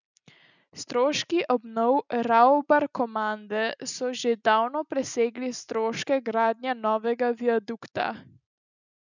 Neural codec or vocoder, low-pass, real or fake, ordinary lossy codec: none; 7.2 kHz; real; none